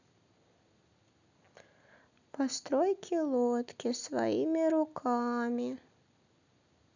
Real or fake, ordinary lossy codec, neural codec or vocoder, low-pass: real; none; none; 7.2 kHz